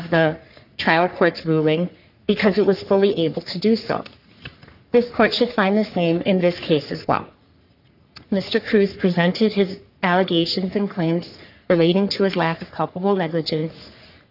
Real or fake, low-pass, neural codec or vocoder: fake; 5.4 kHz; codec, 44.1 kHz, 3.4 kbps, Pupu-Codec